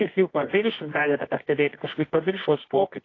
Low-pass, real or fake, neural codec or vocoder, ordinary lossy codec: 7.2 kHz; fake; codec, 24 kHz, 0.9 kbps, WavTokenizer, medium music audio release; AAC, 32 kbps